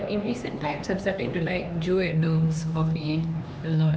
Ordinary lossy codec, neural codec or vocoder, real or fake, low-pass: none; codec, 16 kHz, 2 kbps, X-Codec, HuBERT features, trained on LibriSpeech; fake; none